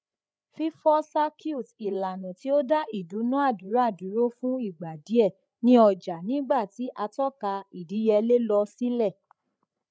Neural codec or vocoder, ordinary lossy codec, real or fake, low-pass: codec, 16 kHz, 8 kbps, FreqCodec, larger model; none; fake; none